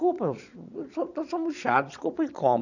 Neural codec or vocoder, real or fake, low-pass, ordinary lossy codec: vocoder, 22.05 kHz, 80 mel bands, Vocos; fake; 7.2 kHz; none